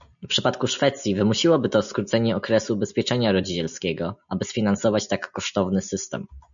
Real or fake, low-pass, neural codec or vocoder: real; 7.2 kHz; none